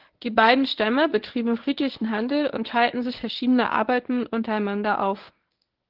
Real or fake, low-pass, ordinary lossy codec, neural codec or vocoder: fake; 5.4 kHz; Opus, 16 kbps; codec, 24 kHz, 0.9 kbps, WavTokenizer, medium speech release version 1